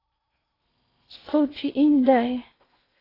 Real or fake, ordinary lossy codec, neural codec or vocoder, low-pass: fake; AAC, 24 kbps; codec, 16 kHz in and 24 kHz out, 0.8 kbps, FocalCodec, streaming, 65536 codes; 5.4 kHz